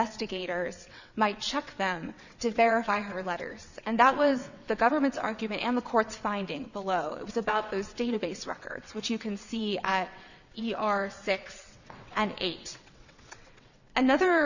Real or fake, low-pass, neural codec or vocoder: fake; 7.2 kHz; vocoder, 22.05 kHz, 80 mel bands, WaveNeXt